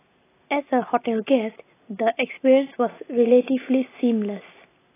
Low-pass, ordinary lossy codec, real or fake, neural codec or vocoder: 3.6 kHz; AAC, 16 kbps; real; none